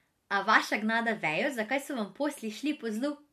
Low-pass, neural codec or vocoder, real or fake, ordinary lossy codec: 14.4 kHz; none; real; MP3, 64 kbps